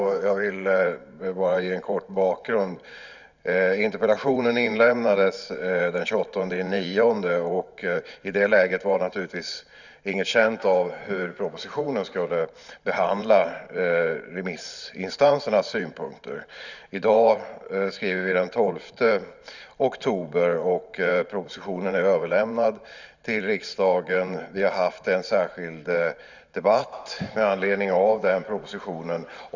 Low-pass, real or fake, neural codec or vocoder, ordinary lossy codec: 7.2 kHz; fake; vocoder, 44.1 kHz, 128 mel bands every 512 samples, BigVGAN v2; none